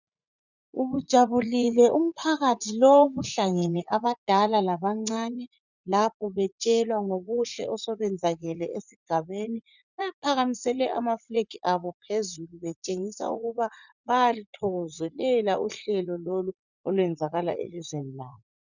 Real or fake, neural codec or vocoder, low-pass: fake; vocoder, 44.1 kHz, 80 mel bands, Vocos; 7.2 kHz